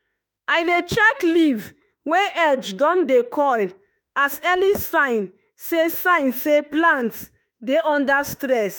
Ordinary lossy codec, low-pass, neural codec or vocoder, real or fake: none; none; autoencoder, 48 kHz, 32 numbers a frame, DAC-VAE, trained on Japanese speech; fake